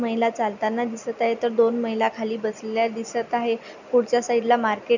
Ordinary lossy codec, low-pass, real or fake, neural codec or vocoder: none; 7.2 kHz; real; none